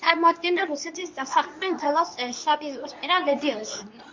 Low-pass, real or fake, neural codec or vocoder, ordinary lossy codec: 7.2 kHz; fake; codec, 24 kHz, 0.9 kbps, WavTokenizer, medium speech release version 2; MP3, 48 kbps